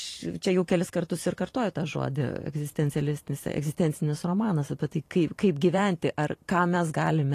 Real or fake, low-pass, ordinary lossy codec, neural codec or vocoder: fake; 14.4 kHz; AAC, 48 kbps; vocoder, 44.1 kHz, 128 mel bands every 512 samples, BigVGAN v2